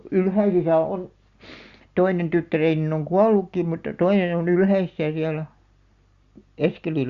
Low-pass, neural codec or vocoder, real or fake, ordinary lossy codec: 7.2 kHz; none; real; Opus, 64 kbps